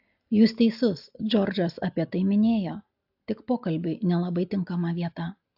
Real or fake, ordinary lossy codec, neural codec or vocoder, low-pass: real; AAC, 48 kbps; none; 5.4 kHz